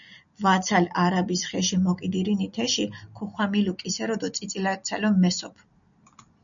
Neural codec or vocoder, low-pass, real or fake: none; 7.2 kHz; real